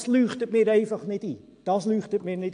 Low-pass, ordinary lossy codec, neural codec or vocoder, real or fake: 9.9 kHz; AAC, 64 kbps; none; real